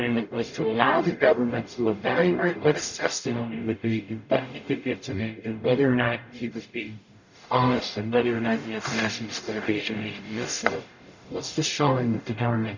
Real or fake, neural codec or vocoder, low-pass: fake; codec, 44.1 kHz, 0.9 kbps, DAC; 7.2 kHz